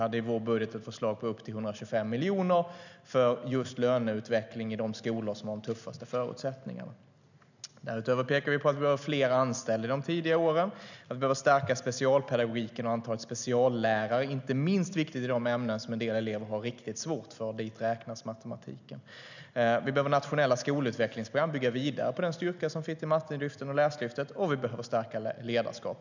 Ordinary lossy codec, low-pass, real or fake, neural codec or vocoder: none; 7.2 kHz; real; none